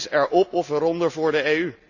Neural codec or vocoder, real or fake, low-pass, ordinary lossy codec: none; real; 7.2 kHz; none